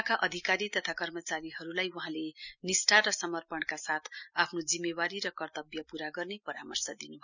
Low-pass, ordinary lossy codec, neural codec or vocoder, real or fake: 7.2 kHz; none; none; real